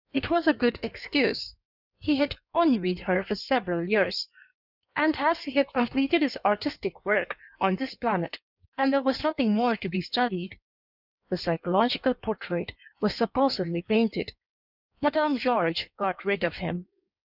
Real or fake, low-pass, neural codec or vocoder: fake; 5.4 kHz; codec, 16 kHz in and 24 kHz out, 1.1 kbps, FireRedTTS-2 codec